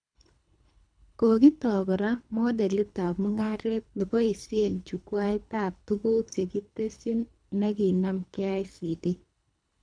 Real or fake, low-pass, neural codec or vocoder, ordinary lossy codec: fake; 9.9 kHz; codec, 24 kHz, 3 kbps, HILCodec; MP3, 96 kbps